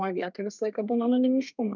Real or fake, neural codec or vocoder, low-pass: fake; codec, 32 kHz, 1.9 kbps, SNAC; 7.2 kHz